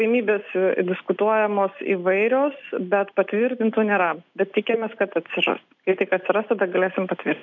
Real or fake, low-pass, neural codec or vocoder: real; 7.2 kHz; none